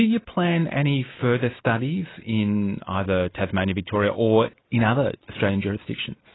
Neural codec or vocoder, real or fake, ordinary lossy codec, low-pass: none; real; AAC, 16 kbps; 7.2 kHz